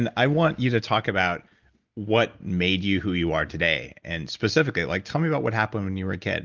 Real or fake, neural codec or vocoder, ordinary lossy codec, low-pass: real; none; Opus, 24 kbps; 7.2 kHz